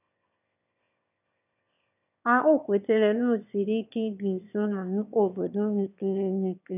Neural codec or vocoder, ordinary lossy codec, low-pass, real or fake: autoencoder, 22.05 kHz, a latent of 192 numbers a frame, VITS, trained on one speaker; none; 3.6 kHz; fake